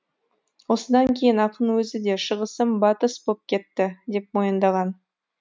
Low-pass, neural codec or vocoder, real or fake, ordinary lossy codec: none; none; real; none